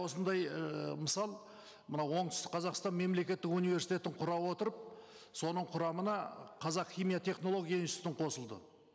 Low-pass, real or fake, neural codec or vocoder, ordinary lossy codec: none; real; none; none